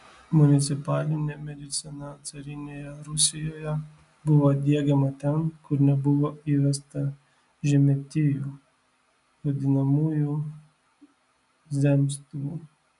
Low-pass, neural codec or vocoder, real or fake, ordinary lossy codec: 10.8 kHz; none; real; MP3, 96 kbps